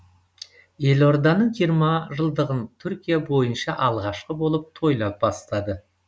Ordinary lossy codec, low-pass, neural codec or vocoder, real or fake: none; none; none; real